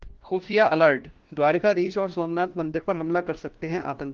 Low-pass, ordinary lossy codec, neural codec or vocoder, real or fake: 7.2 kHz; Opus, 16 kbps; codec, 16 kHz, 1 kbps, FunCodec, trained on LibriTTS, 50 frames a second; fake